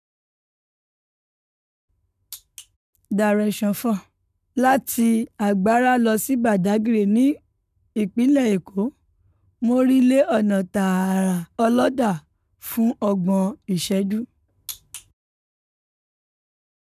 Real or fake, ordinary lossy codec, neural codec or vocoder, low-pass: fake; none; codec, 44.1 kHz, 7.8 kbps, Pupu-Codec; 14.4 kHz